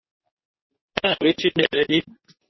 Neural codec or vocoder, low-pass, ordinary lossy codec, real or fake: codec, 24 kHz, 0.9 kbps, WavTokenizer, medium speech release version 2; 7.2 kHz; MP3, 24 kbps; fake